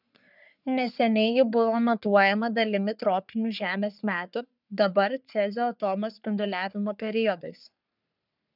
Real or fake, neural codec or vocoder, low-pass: fake; codec, 44.1 kHz, 3.4 kbps, Pupu-Codec; 5.4 kHz